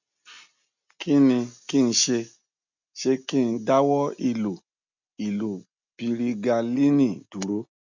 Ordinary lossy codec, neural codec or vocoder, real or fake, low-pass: none; none; real; 7.2 kHz